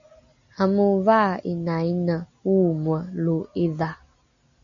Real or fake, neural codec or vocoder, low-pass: real; none; 7.2 kHz